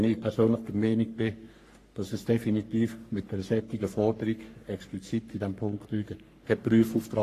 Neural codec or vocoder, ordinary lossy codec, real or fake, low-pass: codec, 44.1 kHz, 3.4 kbps, Pupu-Codec; AAC, 48 kbps; fake; 14.4 kHz